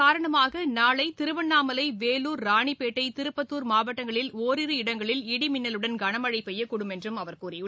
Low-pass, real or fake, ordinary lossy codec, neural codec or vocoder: none; real; none; none